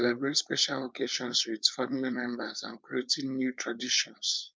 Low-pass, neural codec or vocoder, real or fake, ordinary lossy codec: none; codec, 16 kHz, 4.8 kbps, FACodec; fake; none